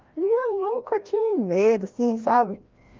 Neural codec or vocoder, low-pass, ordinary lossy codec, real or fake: codec, 16 kHz, 1 kbps, FreqCodec, larger model; 7.2 kHz; Opus, 32 kbps; fake